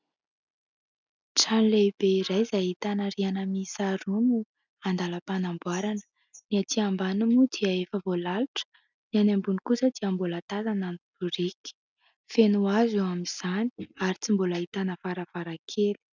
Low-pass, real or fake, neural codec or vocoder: 7.2 kHz; real; none